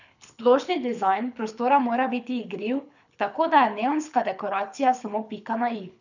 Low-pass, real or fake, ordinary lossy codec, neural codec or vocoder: 7.2 kHz; fake; none; codec, 24 kHz, 6 kbps, HILCodec